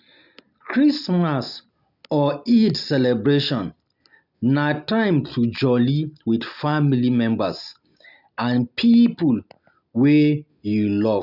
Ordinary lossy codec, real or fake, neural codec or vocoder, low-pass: none; real; none; 5.4 kHz